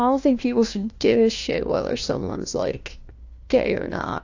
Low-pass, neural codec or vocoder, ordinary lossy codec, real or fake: 7.2 kHz; codec, 16 kHz, 1 kbps, FunCodec, trained on LibriTTS, 50 frames a second; AAC, 48 kbps; fake